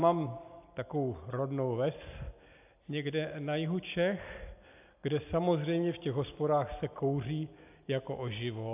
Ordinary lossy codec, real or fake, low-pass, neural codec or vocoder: AAC, 32 kbps; real; 3.6 kHz; none